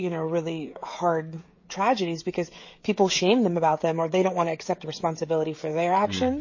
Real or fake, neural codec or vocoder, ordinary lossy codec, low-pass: fake; codec, 16 kHz, 16 kbps, FreqCodec, smaller model; MP3, 32 kbps; 7.2 kHz